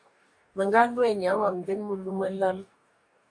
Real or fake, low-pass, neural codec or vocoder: fake; 9.9 kHz; codec, 44.1 kHz, 2.6 kbps, DAC